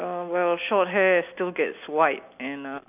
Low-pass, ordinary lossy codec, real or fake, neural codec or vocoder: 3.6 kHz; none; real; none